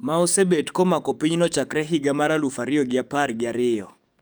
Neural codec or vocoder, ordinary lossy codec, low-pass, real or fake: codec, 44.1 kHz, 7.8 kbps, DAC; none; none; fake